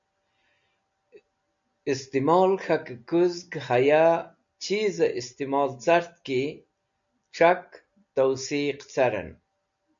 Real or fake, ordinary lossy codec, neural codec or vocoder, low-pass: real; MP3, 64 kbps; none; 7.2 kHz